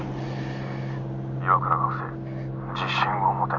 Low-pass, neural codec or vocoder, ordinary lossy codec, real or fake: 7.2 kHz; none; none; real